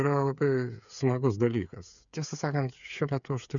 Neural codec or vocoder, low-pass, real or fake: codec, 16 kHz, 8 kbps, FreqCodec, smaller model; 7.2 kHz; fake